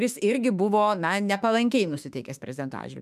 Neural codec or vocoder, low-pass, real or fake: autoencoder, 48 kHz, 32 numbers a frame, DAC-VAE, trained on Japanese speech; 14.4 kHz; fake